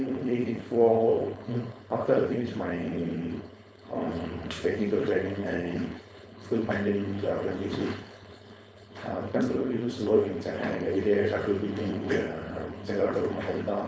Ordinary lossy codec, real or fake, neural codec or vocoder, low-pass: none; fake; codec, 16 kHz, 4.8 kbps, FACodec; none